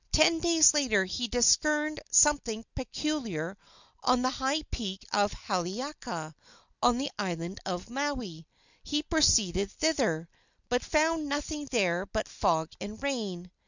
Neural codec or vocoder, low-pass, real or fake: none; 7.2 kHz; real